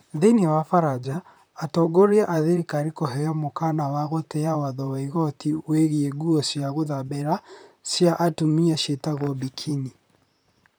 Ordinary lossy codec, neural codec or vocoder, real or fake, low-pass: none; vocoder, 44.1 kHz, 128 mel bands, Pupu-Vocoder; fake; none